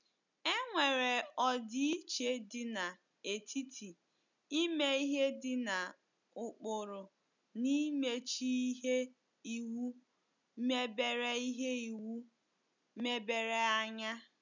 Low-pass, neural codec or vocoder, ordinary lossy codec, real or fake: 7.2 kHz; none; none; real